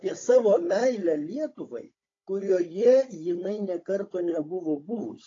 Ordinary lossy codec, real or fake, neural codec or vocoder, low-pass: AAC, 32 kbps; fake; codec, 16 kHz, 16 kbps, FunCodec, trained on Chinese and English, 50 frames a second; 7.2 kHz